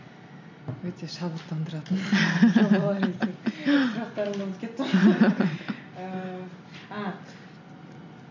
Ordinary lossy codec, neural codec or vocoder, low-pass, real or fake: MP3, 48 kbps; none; 7.2 kHz; real